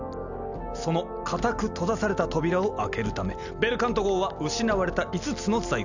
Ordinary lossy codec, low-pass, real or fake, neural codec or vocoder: none; 7.2 kHz; real; none